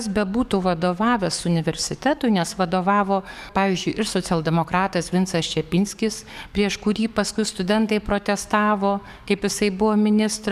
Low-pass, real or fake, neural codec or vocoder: 14.4 kHz; fake; codec, 44.1 kHz, 7.8 kbps, DAC